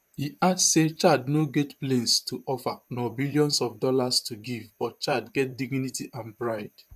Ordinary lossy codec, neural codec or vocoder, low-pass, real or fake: none; vocoder, 44.1 kHz, 128 mel bands, Pupu-Vocoder; 14.4 kHz; fake